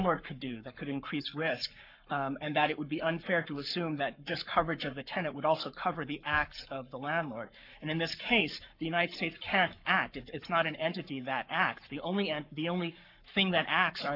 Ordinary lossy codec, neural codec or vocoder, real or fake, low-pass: MP3, 48 kbps; codec, 44.1 kHz, 7.8 kbps, Pupu-Codec; fake; 5.4 kHz